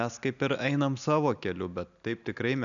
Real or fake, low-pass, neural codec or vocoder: real; 7.2 kHz; none